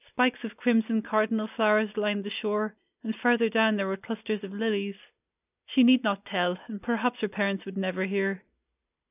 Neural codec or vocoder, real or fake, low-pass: none; real; 3.6 kHz